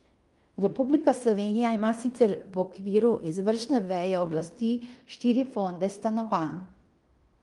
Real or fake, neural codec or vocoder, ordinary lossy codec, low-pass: fake; codec, 16 kHz in and 24 kHz out, 0.9 kbps, LongCat-Audio-Codec, fine tuned four codebook decoder; Opus, 32 kbps; 10.8 kHz